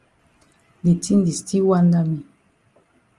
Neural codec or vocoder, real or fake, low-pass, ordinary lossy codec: none; real; 10.8 kHz; Opus, 32 kbps